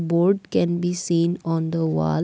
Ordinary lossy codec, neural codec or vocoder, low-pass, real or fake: none; none; none; real